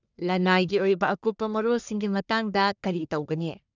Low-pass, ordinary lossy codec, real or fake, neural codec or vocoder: 7.2 kHz; none; fake; codec, 44.1 kHz, 1.7 kbps, Pupu-Codec